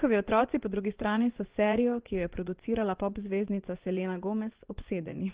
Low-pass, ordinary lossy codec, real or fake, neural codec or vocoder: 3.6 kHz; Opus, 16 kbps; fake; vocoder, 24 kHz, 100 mel bands, Vocos